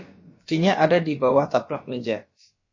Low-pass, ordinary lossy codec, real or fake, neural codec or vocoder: 7.2 kHz; MP3, 32 kbps; fake; codec, 16 kHz, about 1 kbps, DyCAST, with the encoder's durations